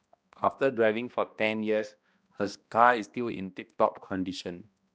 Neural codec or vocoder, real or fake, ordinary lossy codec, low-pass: codec, 16 kHz, 1 kbps, X-Codec, HuBERT features, trained on balanced general audio; fake; none; none